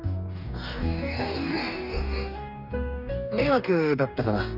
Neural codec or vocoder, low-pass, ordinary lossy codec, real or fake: codec, 44.1 kHz, 2.6 kbps, DAC; 5.4 kHz; none; fake